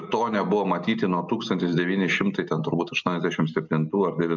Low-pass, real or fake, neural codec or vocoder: 7.2 kHz; real; none